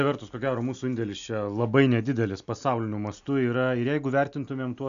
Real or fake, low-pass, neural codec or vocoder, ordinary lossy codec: real; 7.2 kHz; none; AAC, 96 kbps